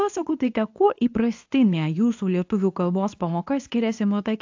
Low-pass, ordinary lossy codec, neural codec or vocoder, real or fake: 7.2 kHz; AAC, 48 kbps; codec, 24 kHz, 0.9 kbps, WavTokenizer, medium speech release version 2; fake